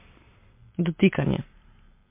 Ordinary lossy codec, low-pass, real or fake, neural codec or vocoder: MP3, 24 kbps; 3.6 kHz; real; none